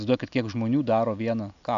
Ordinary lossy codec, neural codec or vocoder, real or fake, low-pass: AAC, 96 kbps; none; real; 7.2 kHz